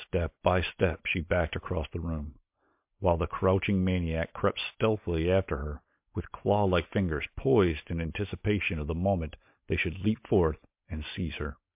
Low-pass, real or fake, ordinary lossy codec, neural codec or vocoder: 3.6 kHz; real; MP3, 32 kbps; none